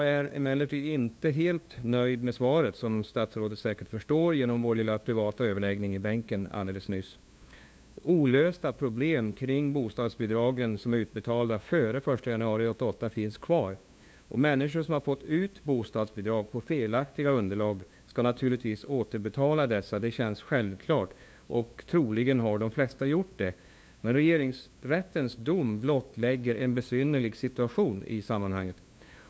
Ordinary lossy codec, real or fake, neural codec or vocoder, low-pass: none; fake; codec, 16 kHz, 2 kbps, FunCodec, trained on LibriTTS, 25 frames a second; none